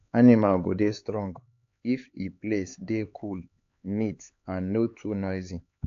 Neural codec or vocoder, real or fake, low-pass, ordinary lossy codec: codec, 16 kHz, 4 kbps, X-Codec, HuBERT features, trained on LibriSpeech; fake; 7.2 kHz; AAC, 48 kbps